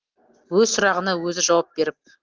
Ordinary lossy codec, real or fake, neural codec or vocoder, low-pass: Opus, 16 kbps; real; none; 7.2 kHz